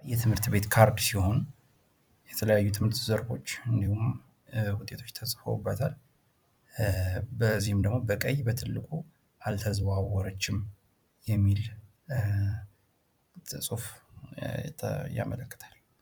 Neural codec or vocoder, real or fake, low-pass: vocoder, 44.1 kHz, 128 mel bands every 256 samples, BigVGAN v2; fake; 19.8 kHz